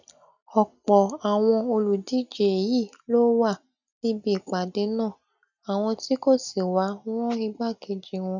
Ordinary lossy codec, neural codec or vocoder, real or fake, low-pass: none; none; real; 7.2 kHz